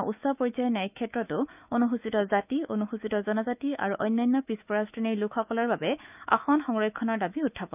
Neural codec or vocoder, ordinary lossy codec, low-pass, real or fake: autoencoder, 48 kHz, 128 numbers a frame, DAC-VAE, trained on Japanese speech; none; 3.6 kHz; fake